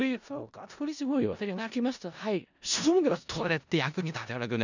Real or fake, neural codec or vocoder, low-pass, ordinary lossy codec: fake; codec, 16 kHz in and 24 kHz out, 0.4 kbps, LongCat-Audio-Codec, four codebook decoder; 7.2 kHz; none